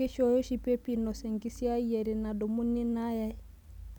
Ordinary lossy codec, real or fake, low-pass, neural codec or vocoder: none; real; 19.8 kHz; none